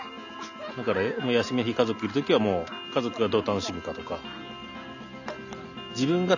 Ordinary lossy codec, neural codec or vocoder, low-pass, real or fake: none; none; 7.2 kHz; real